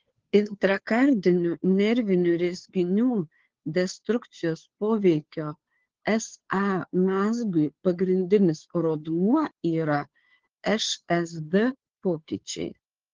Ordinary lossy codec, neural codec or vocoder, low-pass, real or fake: Opus, 16 kbps; codec, 16 kHz, 2 kbps, FunCodec, trained on Chinese and English, 25 frames a second; 7.2 kHz; fake